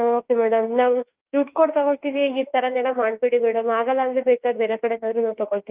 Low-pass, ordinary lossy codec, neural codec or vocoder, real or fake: 3.6 kHz; Opus, 32 kbps; autoencoder, 48 kHz, 32 numbers a frame, DAC-VAE, trained on Japanese speech; fake